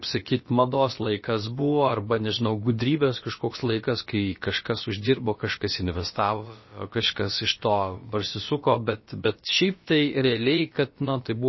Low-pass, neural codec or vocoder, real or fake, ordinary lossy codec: 7.2 kHz; codec, 16 kHz, about 1 kbps, DyCAST, with the encoder's durations; fake; MP3, 24 kbps